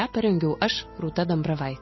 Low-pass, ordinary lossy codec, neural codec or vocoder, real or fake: 7.2 kHz; MP3, 24 kbps; none; real